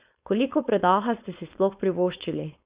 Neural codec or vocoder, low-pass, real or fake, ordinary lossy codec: codec, 16 kHz, 4.8 kbps, FACodec; 3.6 kHz; fake; Opus, 64 kbps